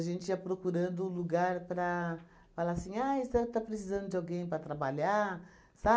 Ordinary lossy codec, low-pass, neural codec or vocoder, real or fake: none; none; none; real